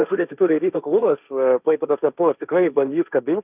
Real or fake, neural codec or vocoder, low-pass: fake; codec, 16 kHz, 1.1 kbps, Voila-Tokenizer; 3.6 kHz